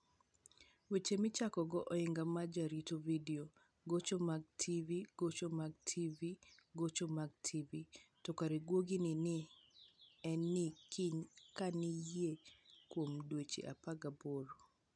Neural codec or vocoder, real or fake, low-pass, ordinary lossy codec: none; real; 9.9 kHz; none